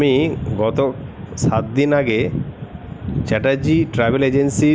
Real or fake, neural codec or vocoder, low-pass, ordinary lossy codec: real; none; none; none